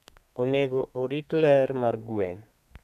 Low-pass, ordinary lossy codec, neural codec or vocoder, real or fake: 14.4 kHz; none; codec, 32 kHz, 1.9 kbps, SNAC; fake